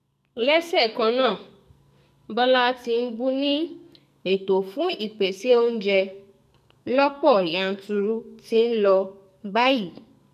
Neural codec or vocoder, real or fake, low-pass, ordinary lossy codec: codec, 44.1 kHz, 2.6 kbps, SNAC; fake; 14.4 kHz; none